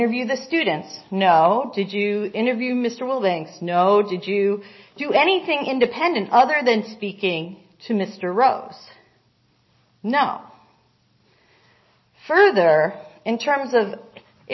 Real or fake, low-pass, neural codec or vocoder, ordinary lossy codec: real; 7.2 kHz; none; MP3, 24 kbps